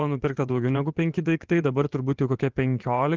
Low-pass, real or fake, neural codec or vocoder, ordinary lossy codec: 7.2 kHz; fake; vocoder, 24 kHz, 100 mel bands, Vocos; Opus, 16 kbps